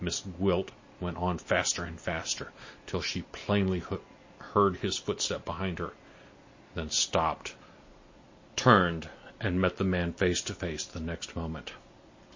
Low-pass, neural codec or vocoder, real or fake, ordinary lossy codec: 7.2 kHz; none; real; MP3, 32 kbps